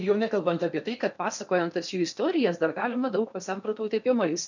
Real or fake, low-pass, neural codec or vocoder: fake; 7.2 kHz; codec, 16 kHz in and 24 kHz out, 0.8 kbps, FocalCodec, streaming, 65536 codes